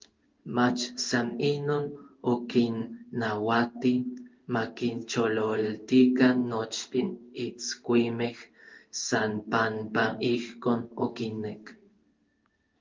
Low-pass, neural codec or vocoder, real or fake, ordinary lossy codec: 7.2 kHz; codec, 16 kHz in and 24 kHz out, 1 kbps, XY-Tokenizer; fake; Opus, 32 kbps